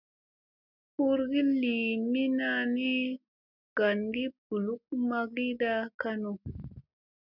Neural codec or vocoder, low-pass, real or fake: none; 5.4 kHz; real